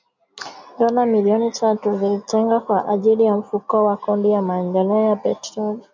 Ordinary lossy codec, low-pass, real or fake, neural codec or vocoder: MP3, 64 kbps; 7.2 kHz; real; none